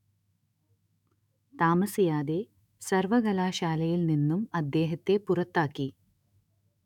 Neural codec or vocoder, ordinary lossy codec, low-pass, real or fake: autoencoder, 48 kHz, 128 numbers a frame, DAC-VAE, trained on Japanese speech; none; 19.8 kHz; fake